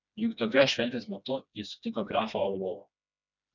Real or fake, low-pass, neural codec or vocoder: fake; 7.2 kHz; codec, 16 kHz, 1 kbps, FreqCodec, smaller model